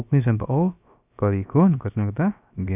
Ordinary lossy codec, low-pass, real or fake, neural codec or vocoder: none; 3.6 kHz; fake; codec, 16 kHz, about 1 kbps, DyCAST, with the encoder's durations